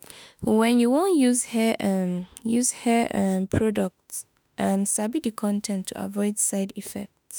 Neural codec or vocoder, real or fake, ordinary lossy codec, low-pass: autoencoder, 48 kHz, 32 numbers a frame, DAC-VAE, trained on Japanese speech; fake; none; none